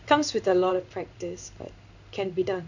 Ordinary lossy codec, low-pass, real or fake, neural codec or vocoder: none; 7.2 kHz; fake; codec, 16 kHz in and 24 kHz out, 1 kbps, XY-Tokenizer